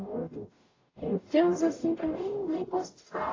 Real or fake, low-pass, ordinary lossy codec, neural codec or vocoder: fake; 7.2 kHz; AAC, 32 kbps; codec, 44.1 kHz, 0.9 kbps, DAC